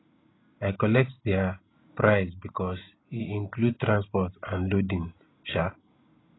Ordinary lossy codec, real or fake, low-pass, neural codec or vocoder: AAC, 16 kbps; real; 7.2 kHz; none